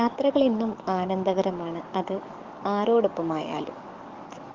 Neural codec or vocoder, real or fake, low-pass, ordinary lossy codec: codec, 44.1 kHz, 7.8 kbps, DAC; fake; 7.2 kHz; Opus, 32 kbps